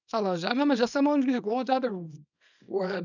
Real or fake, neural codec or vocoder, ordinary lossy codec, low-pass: fake; codec, 24 kHz, 0.9 kbps, WavTokenizer, small release; none; 7.2 kHz